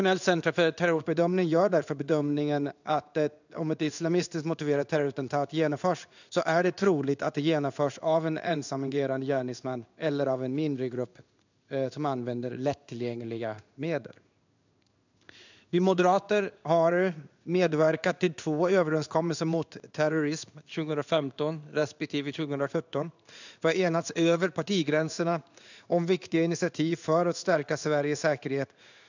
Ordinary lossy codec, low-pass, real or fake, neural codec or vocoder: none; 7.2 kHz; fake; codec, 16 kHz in and 24 kHz out, 1 kbps, XY-Tokenizer